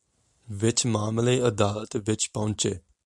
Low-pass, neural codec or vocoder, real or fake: 10.8 kHz; none; real